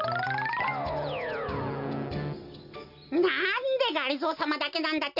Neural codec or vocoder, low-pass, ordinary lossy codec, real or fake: none; 5.4 kHz; none; real